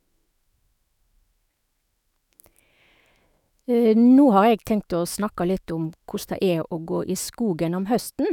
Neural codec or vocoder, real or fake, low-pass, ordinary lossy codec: autoencoder, 48 kHz, 128 numbers a frame, DAC-VAE, trained on Japanese speech; fake; 19.8 kHz; none